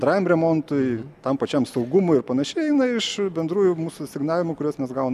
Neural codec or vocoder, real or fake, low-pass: none; real; 14.4 kHz